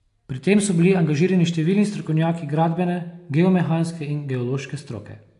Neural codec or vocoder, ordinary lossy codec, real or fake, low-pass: none; AAC, 64 kbps; real; 10.8 kHz